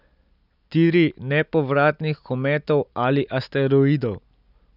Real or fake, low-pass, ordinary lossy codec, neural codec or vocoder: real; 5.4 kHz; none; none